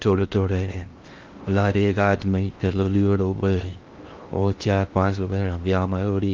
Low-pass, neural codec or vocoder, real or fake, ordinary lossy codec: 7.2 kHz; codec, 16 kHz in and 24 kHz out, 0.6 kbps, FocalCodec, streaming, 2048 codes; fake; Opus, 32 kbps